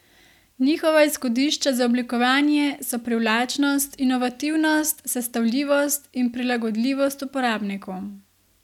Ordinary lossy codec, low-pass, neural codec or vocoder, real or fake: none; 19.8 kHz; none; real